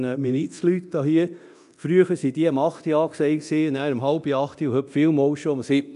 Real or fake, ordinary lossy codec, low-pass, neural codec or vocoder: fake; none; 10.8 kHz; codec, 24 kHz, 0.9 kbps, DualCodec